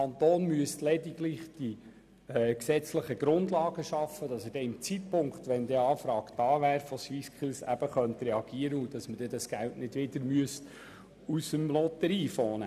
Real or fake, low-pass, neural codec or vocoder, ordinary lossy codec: real; 14.4 kHz; none; none